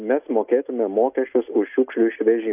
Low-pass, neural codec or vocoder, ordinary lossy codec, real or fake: 3.6 kHz; none; AAC, 32 kbps; real